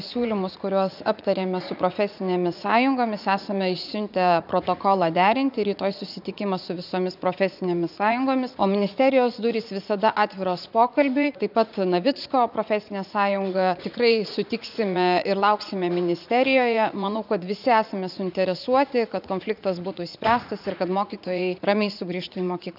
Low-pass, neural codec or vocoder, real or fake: 5.4 kHz; vocoder, 44.1 kHz, 80 mel bands, Vocos; fake